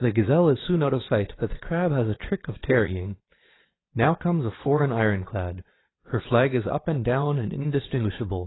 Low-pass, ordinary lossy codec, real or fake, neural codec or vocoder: 7.2 kHz; AAC, 16 kbps; fake; vocoder, 22.05 kHz, 80 mel bands, Vocos